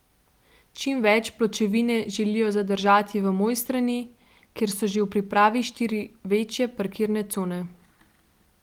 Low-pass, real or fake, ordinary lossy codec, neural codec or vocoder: 19.8 kHz; real; Opus, 24 kbps; none